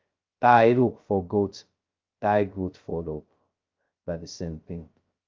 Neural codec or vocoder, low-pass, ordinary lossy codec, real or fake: codec, 16 kHz, 0.2 kbps, FocalCodec; 7.2 kHz; Opus, 24 kbps; fake